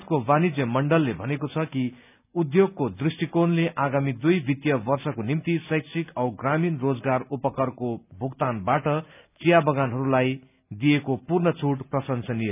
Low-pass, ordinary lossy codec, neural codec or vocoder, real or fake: 3.6 kHz; none; none; real